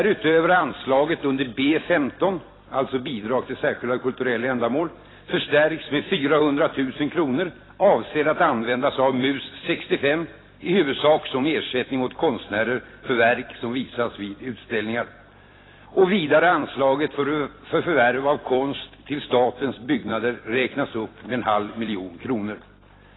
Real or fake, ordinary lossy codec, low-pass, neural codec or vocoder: fake; AAC, 16 kbps; 7.2 kHz; vocoder, 44.1 kHz, 128 mel bands every 256 samples, BigVGAN v2